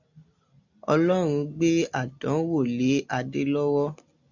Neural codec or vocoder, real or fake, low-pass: none; real; 7.2 kHz